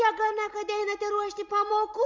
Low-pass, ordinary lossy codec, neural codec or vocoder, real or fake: 7.2 kHz; Opus, 32 kbps; none; real